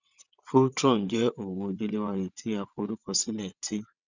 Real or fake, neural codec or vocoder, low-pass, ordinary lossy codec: fake; vocoder, 22.05 kHz, 80 mel bands, WaveNeXt; 7.2 kHz; none